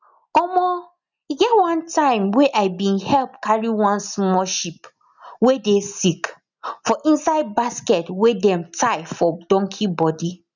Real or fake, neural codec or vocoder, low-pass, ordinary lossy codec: real; none; 7.2 kHz; none